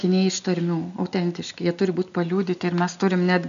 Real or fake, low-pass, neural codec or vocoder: real; 7.2 kHz; none